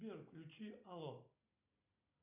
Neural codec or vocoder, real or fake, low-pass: none; real; 3.6 kHz